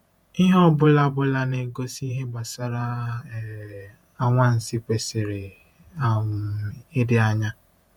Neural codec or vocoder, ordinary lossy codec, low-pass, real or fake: vocoder, 48 kHz, 128 mel bands, Vocos; none; 19.8 kHz; fake